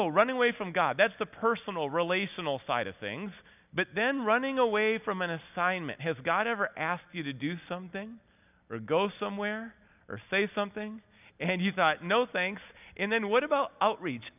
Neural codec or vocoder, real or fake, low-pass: none; real; 3.6 kHz